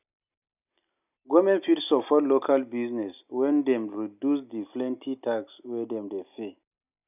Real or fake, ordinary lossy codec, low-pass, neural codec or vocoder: real; none; 3.6 kHz; none